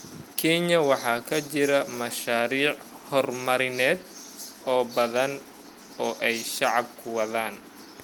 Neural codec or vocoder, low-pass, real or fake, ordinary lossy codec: none; 19.8 kHz; real; Opus, 32 kbps